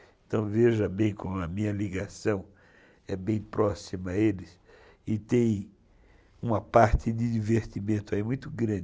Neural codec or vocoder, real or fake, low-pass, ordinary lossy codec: none; real; none; none